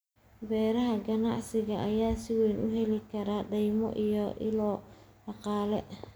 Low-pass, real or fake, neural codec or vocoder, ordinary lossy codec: none; real; none; none